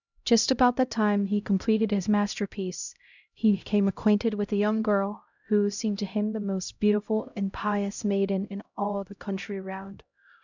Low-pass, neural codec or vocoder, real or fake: 7.2 kHz; codec, 16 kHz, 0.5 kbps, X-Codec, HuBERT features, trained on LibriSpeech; fake